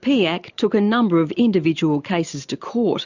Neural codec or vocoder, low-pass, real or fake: none; 7.2 kHz; real